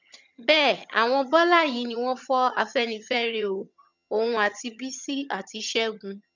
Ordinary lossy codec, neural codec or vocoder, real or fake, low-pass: none; vocoder, 22.05 kHz, 80 mel bands, HiFi-GAN; fake; 7.2 kHz